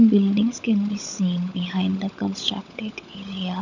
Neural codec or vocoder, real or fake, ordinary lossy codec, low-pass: codec, 16 kHz, 16 kbps, FunCodec, trained on LibriTTS, 50 frames a second; fake; none; 7.2 kHz